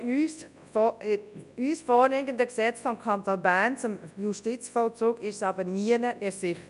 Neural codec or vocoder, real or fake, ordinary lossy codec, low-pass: codec, 24 kHz, 0.9 kbps, WavTokenizer, large speech release; fake; none; 10.8 kHz